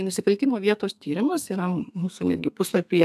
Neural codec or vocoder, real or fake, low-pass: codec, 32 kHz, 1.9 kbps, SNAC; fake; 14.4 kHz